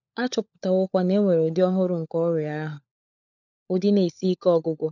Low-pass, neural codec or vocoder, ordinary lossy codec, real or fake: 7.2 kHz; codec, 16 kHz, 16 kbps, FunCodec, trained on LibriTTS, 50 frames a second; none; fake